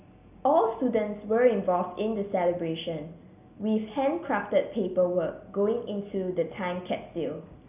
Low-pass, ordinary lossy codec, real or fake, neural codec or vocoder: 3.6 kHz; none; real; none